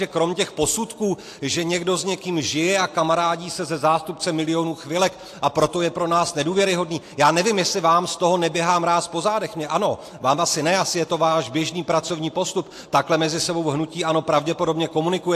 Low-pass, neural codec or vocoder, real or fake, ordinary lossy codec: 14.4 kHz; none; real; AAC, 64 kbps